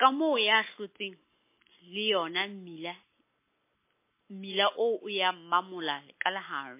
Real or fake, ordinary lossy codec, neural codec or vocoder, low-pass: real; MP3, 24 kbps; none; 3.6 kHz